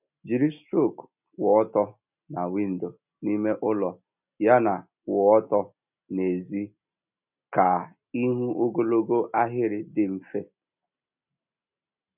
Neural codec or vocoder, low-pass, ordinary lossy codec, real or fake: none; 3.6 kHz; none; real